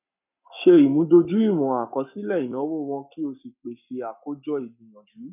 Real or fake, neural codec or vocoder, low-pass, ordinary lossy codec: fake; codec, 44.1 kHz, 7.8 kbps, Pupu-Codec; 3.6 kHz; none